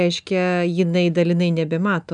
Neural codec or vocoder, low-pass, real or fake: none; 9.9 kHz; real